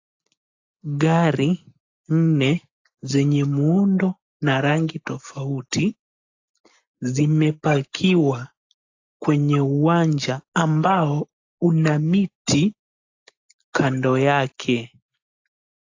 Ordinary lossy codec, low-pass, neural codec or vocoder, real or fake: AAC, 48 kbps; 7.2 kHz; none; real